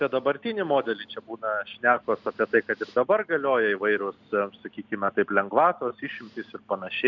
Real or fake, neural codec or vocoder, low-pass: real; none; 7.2 kHz